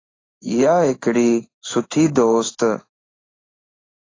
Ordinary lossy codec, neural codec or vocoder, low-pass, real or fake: AAC, 32 kbps; codec, 16 kHz in and 24 kHz out, 1 kbps, XY-Tokenizer; 7.2 kHz; fake